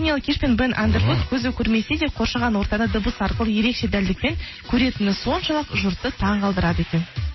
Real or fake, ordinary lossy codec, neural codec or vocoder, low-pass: real; MP3, 24 kbps; none; 7.2 kHz